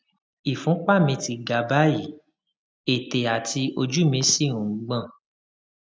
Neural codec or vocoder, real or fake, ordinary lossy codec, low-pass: none; real; none; none